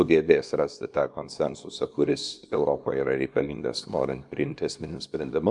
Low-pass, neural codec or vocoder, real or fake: 10.8 kHz; codec, 24 kHz, 0.9 kbps, WavTokenizer, small release; fake